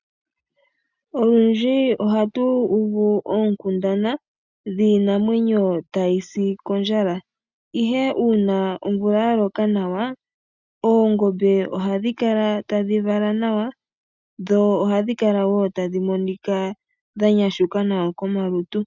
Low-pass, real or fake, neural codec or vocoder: 7.2 kHz; real; none